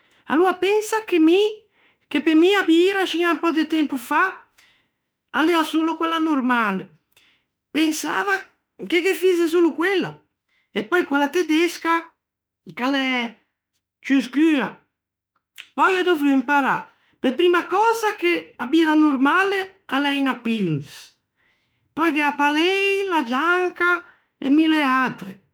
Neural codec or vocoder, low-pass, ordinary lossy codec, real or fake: autoencoder, 48 kHz, 32 numbers a frame, DAC-VAE, trained on Japanese speech; none; none; fake